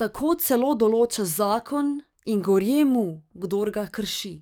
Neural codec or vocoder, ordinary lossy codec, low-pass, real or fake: codec, 44.1 kHz, 7.8 kbps, DAC; none; none; fake